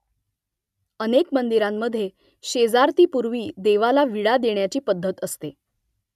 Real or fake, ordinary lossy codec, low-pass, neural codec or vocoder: real; none; 14.4 kHz; none